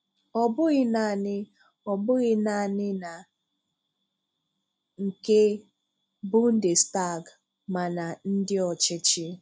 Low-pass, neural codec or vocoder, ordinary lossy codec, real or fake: none; none; none; real